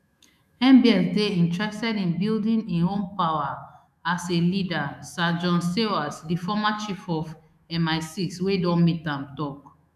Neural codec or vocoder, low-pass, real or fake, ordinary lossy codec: autoencoder, 48 kHz, 128 numbers a frame, DAC-VAE, trained on Japanese speech; 14.4 kHz; fake; none